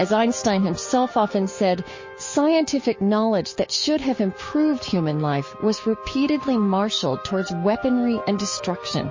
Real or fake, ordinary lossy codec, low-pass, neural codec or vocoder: fake; MP3, 32 kbps; 7.2 kHz; codec, 16 kHz, 6 kbps, DAC